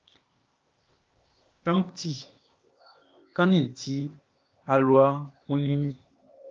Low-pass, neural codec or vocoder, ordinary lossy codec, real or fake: 7.2 kHz; codec, 16 kHz, 0.8 kbps, ZipCodec; Opus, 24 kbps; fake